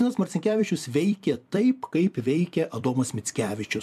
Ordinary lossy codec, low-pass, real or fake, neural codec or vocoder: MP3, 96 kbps; 14.4 kHz; fake; vocoder, 44.1 kHz, 128 mel bands every 256 samples, BigVGAN v2